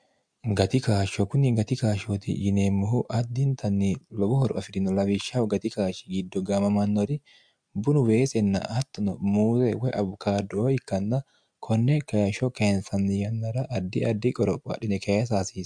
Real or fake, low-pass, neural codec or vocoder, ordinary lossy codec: real; 9.9 kHz; none; MP3, 64 kbps